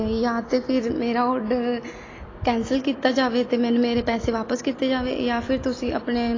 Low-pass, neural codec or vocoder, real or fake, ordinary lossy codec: 7.2 kHz; none; real; AAC, 32 kbps